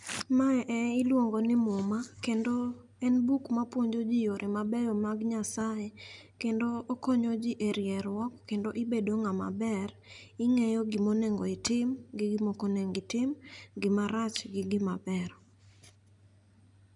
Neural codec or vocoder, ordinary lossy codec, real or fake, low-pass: none; none; real; 10.8 kHz